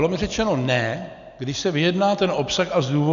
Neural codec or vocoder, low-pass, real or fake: none; 7.2 kHz; real